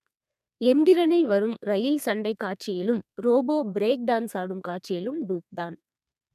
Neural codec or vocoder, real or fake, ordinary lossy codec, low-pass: codec, 32 kHz, 1.9 kbps, SNAC; fake; none; 14.4 kHz